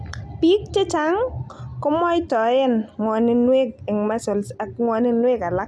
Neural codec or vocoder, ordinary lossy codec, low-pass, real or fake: none; none; none; real